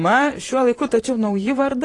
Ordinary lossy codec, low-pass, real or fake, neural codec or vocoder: AAC, 32 kbps; 10.8 kHz; fake; vocoder, 44.1 kHz, 128 mel bands, Pupu-Vocoder